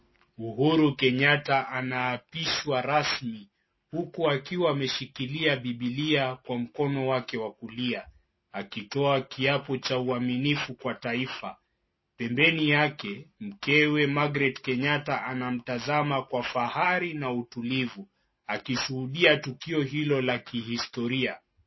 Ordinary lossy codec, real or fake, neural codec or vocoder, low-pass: MP3, 24 kbps; real; none; 7.2 kHz